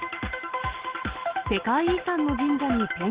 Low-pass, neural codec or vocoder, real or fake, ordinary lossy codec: 3.6 kHz; none; real; Opus, 16 kbps